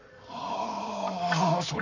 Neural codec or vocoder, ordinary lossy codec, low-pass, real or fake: codec, 44.1 kHz, 7.8 kbps, Pupu-Codec; none; 7.2 kHz; fake